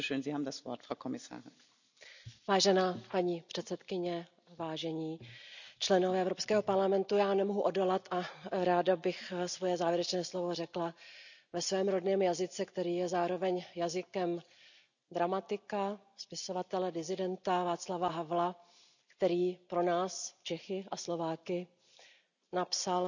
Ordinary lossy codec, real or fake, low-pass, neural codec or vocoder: none; real; 7.2 kHz; none